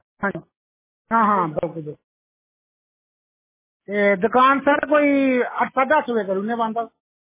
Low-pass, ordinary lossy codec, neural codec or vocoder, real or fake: 3.6 kHz; MP3, 16 kbps; none; real